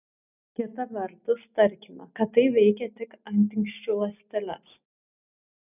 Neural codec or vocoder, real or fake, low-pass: none; real; 3.6 kHz